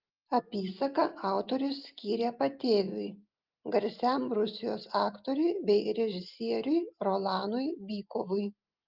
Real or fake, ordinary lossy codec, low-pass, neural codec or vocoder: real; Opus, 24 kbps; 5.4 kHz; none